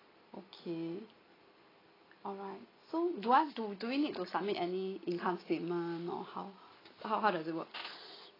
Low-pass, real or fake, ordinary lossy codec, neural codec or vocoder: 5.4 kHz; real; AAC, 24 kbps; none